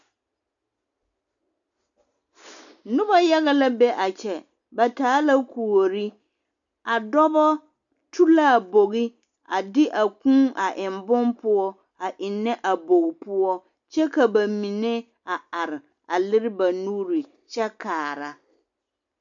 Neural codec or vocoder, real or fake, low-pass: none; real; 7.2 kHz